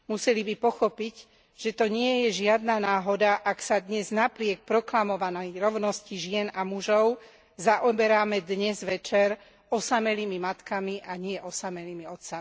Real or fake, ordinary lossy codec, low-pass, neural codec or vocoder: real; none; none; none